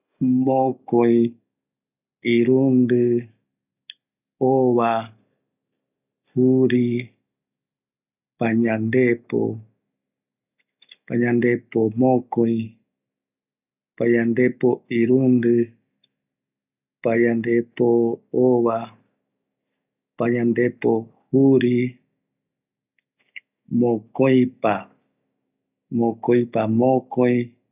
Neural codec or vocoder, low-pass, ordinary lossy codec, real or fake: none; 3.6 kHz; none; real